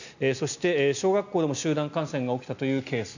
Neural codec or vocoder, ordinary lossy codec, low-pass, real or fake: none; none; 7.2 kHz; real